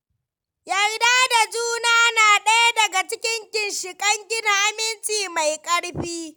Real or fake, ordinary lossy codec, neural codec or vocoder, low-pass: real; none; none; none